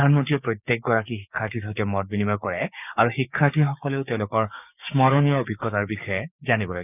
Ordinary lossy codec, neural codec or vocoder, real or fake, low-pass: none; codec, 16 kHz, 6 kbps, DAC; fake; 3.6 kHz